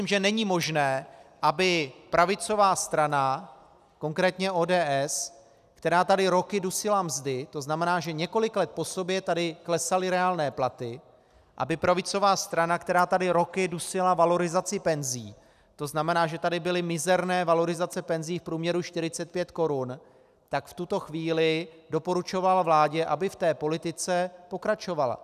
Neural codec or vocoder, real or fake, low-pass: none; real; 14.4 kHz